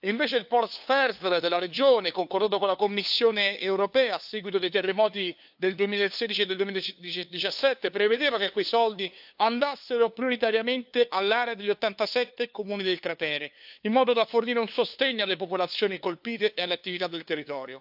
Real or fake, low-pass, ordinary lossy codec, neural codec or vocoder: fake; 5.4 kHz; AAC, 48 kbps; codec, 16 kHz, 2 kbps, FunCodec, trained on LibriTTS, 25 frames a second